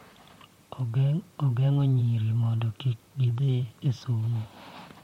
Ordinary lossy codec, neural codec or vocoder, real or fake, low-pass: MP3, 64 kbps; vocoder, 44.1 kHz, 128 mel bands, Pupu-Vocoder; fake; 19.8 kHz